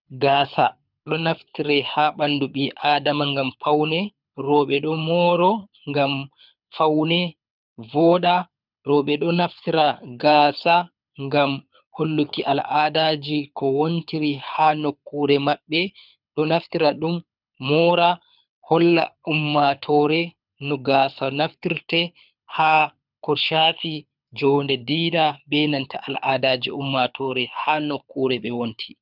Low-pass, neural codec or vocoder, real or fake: 5.4 kHz; codec, 24 kHz, 6 kbps, HILCodec; fake